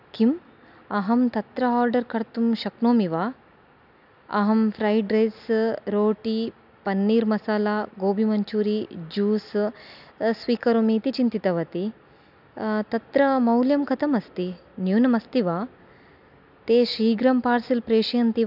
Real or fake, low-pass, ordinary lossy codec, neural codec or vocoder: real; 5.4 kHz; none; none